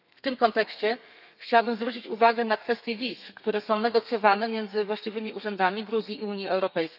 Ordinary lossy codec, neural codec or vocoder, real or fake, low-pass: none; codec, 32 kHz, 1.9 kbps, SNAC; fake; 5.4 kHz